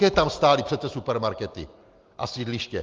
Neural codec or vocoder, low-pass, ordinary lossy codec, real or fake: none; 7.2 kHz; Opus, 32 kbps; real